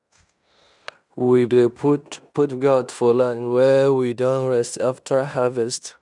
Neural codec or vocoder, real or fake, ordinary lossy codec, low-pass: codec, 16 kHz in and 24 kHz out, 0.9 kbps, LongCat-Audio-Codec, fine tuned four codebook decoder; fake; none; 10.8 kHz